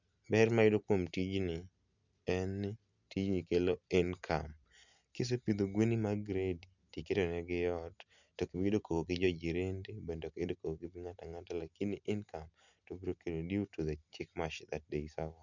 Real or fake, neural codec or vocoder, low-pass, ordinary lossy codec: real; none; 7.2 kHz; none